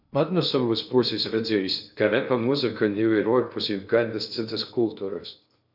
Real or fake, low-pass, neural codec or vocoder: fake; 5.4 kHz; codec, 16 kHz in and 24 kHz out, 0.6 kbps, FocalCodec, streaming, 2048 codes